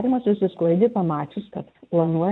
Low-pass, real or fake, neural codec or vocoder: 9.9 kHz; fake; vocoder, 22.05 kHz, 80 mel bands, WaveNeXt